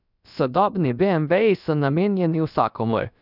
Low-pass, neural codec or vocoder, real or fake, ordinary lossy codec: 5.4 kHz; codec, 16 kHz, about 1 kbps, DyCAST, with the encoder's durations; fake; none